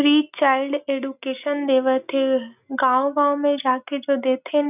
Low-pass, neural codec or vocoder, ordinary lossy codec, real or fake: 3.6 kHz; none; none; real